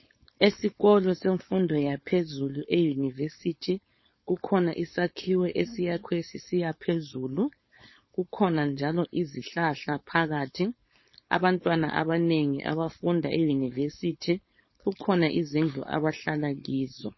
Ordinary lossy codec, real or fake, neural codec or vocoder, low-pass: MP3, 24 kbps; fake; codec, 16 kHz, 4.8 kbps, FACodec; 7.2 kHz